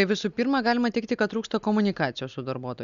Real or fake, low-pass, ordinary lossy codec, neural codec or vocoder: real; 7.2 kHz; Opus, 64 kbps; none